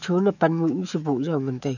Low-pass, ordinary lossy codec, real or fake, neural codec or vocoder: 7.2 kHz; none; fake; autoencoder, 48 kHz, 128 numbers a frame, DAC-VAE, trained on Japanese speech